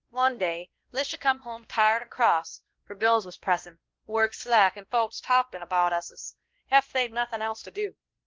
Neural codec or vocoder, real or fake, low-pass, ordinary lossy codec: codec, 16 kHz, 1 kbps, X-Codec, WavLM features, trained on Multilingual LibriSpeech; fake; 7.2 kHz; Opus, 16 kbps